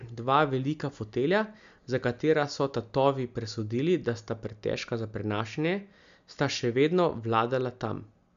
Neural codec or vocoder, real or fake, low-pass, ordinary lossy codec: none; real; 7.2 kHz; MP3, 64 kbps